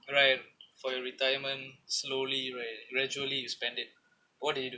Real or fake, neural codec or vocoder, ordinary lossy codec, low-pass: real; none; none; none